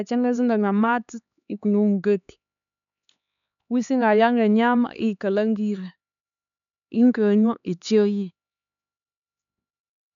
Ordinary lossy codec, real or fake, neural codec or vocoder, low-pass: none; fake; codec, 16 kHz, 4 kbps, X-Codec, HuBERT features, trained on LibriSpeech; 7.2 kHz